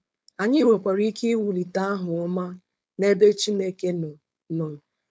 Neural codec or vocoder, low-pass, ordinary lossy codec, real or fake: codec, 16 kHz, 4.8 kbps, FACodec; none; none; fake